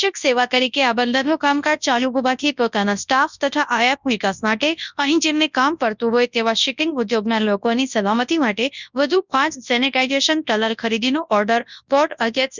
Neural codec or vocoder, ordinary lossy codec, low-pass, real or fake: codec, 24 kHz, 0.9 kbps, WavTokenizer, large speech release; none; 7.2 kHz; fake